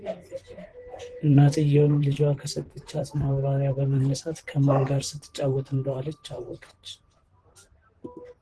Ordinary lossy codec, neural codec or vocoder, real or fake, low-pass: Opus, 16 kbps; vocoder, 44.1 kHz, 128 mel bands, Pupu-Vocoder; fake; 10.8 kHz